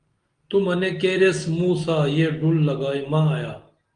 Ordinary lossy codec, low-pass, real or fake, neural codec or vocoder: Opus, 24 kbps; 9.9 kHz; real; none